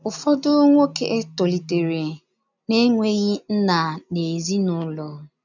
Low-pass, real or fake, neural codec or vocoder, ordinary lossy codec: 7.2 kHz; real; none; none